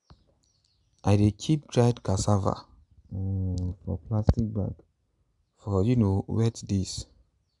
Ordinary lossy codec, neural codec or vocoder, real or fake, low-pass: none; none; real; 10.8 kHz